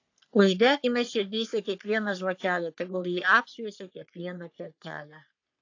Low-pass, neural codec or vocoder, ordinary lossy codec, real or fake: 7.2 kHz; codec, 44.1 kHz, 3.4 kbps, Pupu-Codec; AAC, 48 kbps; fake